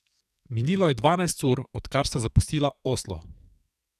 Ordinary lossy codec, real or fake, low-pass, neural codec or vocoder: none; fake; 14.4 kHz; codec, 44.1 kHz, 2.6 kbps, SNAC